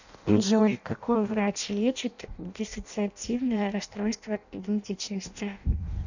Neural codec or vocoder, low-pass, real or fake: codec, 16 kHz in and 24 kHz out, 0.6 kbps, FireRedTTS-2 codec; 7.2 kHz; fake